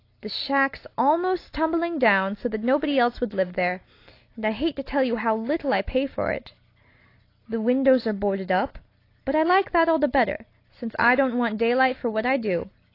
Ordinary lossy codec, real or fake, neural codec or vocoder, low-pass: AAC, 32 kbps; real; none; 5.4 kHz